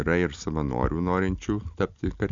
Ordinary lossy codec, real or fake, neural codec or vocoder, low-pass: Opus, 64 kbps; real; none; 7.2 kHz